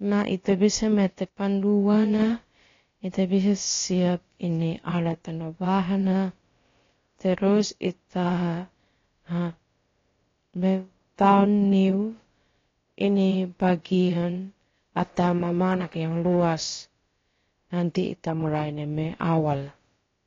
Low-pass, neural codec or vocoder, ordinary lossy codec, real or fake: 7.2 kHz; codec, 16 kHz, about 1 kbps, DyCAST, with the encoder's durations; AAC, 32 kbps; fake